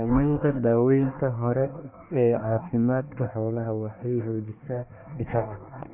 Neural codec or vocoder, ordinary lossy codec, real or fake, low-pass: codec, 24 kHz, 1 kbps, SNAC; none; fake; 3.6 kHz